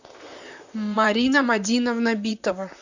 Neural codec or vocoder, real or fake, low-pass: vocoder, 44.1 kHz, 128 mel bands, Pupu-Vocoder; fake; 7.2 kHz